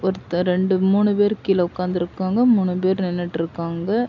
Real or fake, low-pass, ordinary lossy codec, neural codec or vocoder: real; 7.2 kHz; none; none